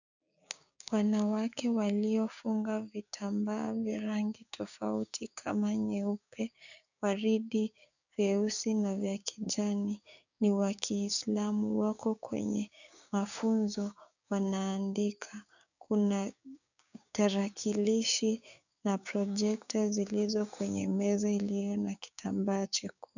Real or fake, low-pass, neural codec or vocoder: fake; 7.2 kHz; codec, 16 kHz, 6 kbps, DAC